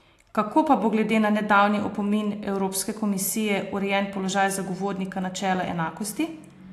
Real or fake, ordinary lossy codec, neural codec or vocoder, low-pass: real; AAC, 64 kbps; none; 14.4 kHz